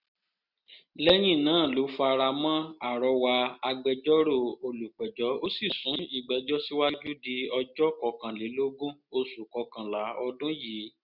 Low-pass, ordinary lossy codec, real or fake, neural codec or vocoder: 5.4 kHz; none; real; none